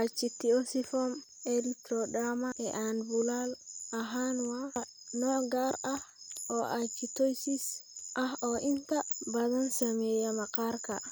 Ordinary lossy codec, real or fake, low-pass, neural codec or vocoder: none; real; none; none